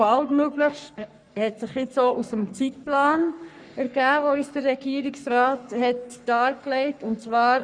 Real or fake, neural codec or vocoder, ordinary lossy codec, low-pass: fake; codec, 44.1 kHz, 3.4 kbps, Pupu-Codec; none; 9.9 kHz